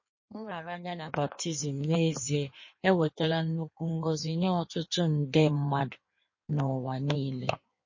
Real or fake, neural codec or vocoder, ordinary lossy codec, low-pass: fake; codec, 16 kHz in and 24 kHz out, 1.1 kbps, FireRedTTS-2 codec; MP3, 32 kbps; 7.2 kHz